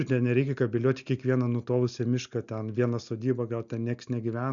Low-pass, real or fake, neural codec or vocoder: 7.2 kHz; real; none